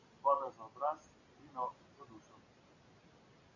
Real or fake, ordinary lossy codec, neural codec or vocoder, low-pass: real; AAC, 48 kbps; none; 7.2 kHz